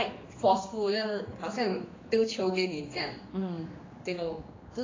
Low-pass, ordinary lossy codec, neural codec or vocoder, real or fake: 7.2 kHz; AAC, 32 kbps; codec, 16 kHz, 2 kbps, X-Codec, HuBERT features, trained on general audio; fake